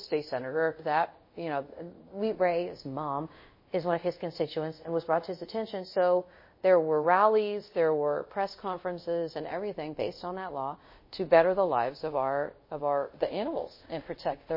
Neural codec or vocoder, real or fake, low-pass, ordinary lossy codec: codec, 24 kHz, 0.5 kbps, DualCodec; fake; 5.4 kHz; MP3, 24 kbps